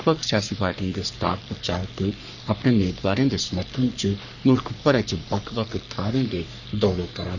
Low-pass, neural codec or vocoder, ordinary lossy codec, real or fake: 7.2 kHz; codec, 44.1 kHz, 3.4 kbps, Pupu-Codec; none; fake